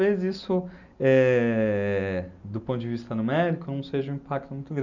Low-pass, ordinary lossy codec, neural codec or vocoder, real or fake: 7.2 kHz; none; none; real